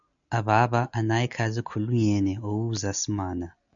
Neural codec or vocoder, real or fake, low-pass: none; real; 7.2 kHz